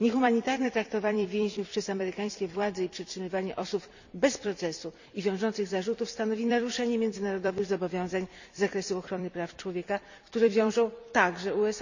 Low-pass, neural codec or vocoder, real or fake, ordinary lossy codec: 7.2 kHz; vocoder, 44.1 kHz, 128 mel bands every 256 samples, BigVGAN v2; fake; none